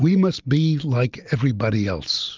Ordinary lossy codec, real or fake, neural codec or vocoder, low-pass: Opus, 32 kbps; real; none; 7.2 kHz